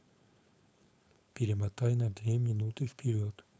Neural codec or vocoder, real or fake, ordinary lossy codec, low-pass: codec, 16 kHz, 4.8 kbps, FACodec; fake; none; none